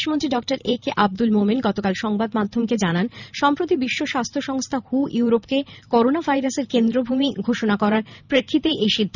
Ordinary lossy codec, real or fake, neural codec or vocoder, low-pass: none; fake; vocoder, 44.1 kHz, 128 mel bands every 512 samples, BigVGAN v2; 7.2 kHz